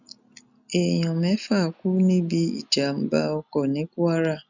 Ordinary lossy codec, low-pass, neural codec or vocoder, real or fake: none; 7.2 kHz; none; real